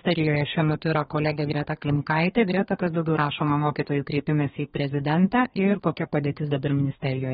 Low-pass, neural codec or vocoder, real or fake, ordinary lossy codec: 14.4 kHz; codec, 32 kHz, 1.9 kbps, SNAC; fake; AAC, 16 kbps